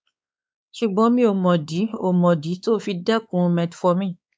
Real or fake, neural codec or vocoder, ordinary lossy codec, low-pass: fake; codec, 16 kHz, 4 kbps, X-Codec, WavLM features, trained on Multilingual LibriSpeech; none; none